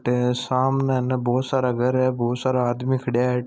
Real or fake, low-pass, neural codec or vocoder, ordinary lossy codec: real; none; none; none